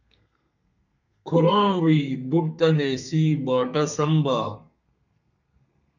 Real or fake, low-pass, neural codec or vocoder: fake; 7.2 kHz; codec, 32 kHz, 1.9 kbps, SNAC